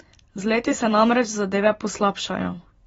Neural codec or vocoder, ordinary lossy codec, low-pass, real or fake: vocoder, 44.1 kHz, 128 mel bands every 256 samples, BigVGAN v2; AAC, 24 kbps; 19.8 kHz; fake